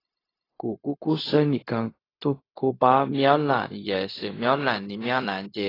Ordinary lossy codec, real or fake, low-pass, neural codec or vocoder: AAC, 24 kbps; fake; 5.4 kHz; codec, 16 kHz, 0.4 kbps, LongCat-Audio-Codec